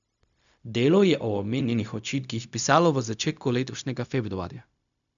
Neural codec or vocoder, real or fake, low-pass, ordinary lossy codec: codec, 16 kHz, 0.4 kbps, LongCat-Audio-Codec; fake; 7.2 kHz; none